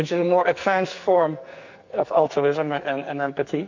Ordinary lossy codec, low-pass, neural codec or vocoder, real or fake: MP3, 48 kbps; 7.2 kHz; codec, 44.1 kHz, 2.6 kbps, SNAC; fake